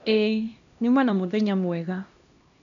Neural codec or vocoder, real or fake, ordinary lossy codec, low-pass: codec, 16 kHz, 1 kbps, X-Codec, HuBERT features, trained on LibriSpeech; fake; none; 7.2 kHz